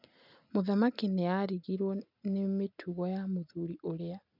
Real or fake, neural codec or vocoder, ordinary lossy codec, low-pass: real; none; none; 5.4 kHz